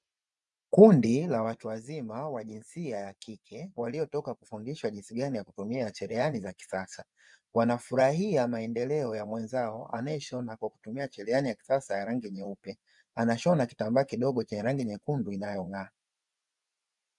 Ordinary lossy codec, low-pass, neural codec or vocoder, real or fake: MP3, 96 kbps; 10.8 kHz; vocoder, 24 kHz, 100 mel bands, Vocos; fake